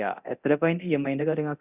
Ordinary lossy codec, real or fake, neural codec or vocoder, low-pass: Opus, 64 kbps; fake; codec, 24 kHz, 0.9 kbps, DualCodec; 3.6 kHz